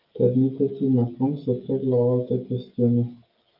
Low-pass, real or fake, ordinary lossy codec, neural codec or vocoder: 5.4 kHz; fake; Opus, 24 kbps; codec, 16 kHz, 16 kbps, FreqCodec, smaller model